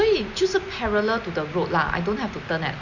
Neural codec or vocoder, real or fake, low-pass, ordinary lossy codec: none; real; 7.2 kHz; none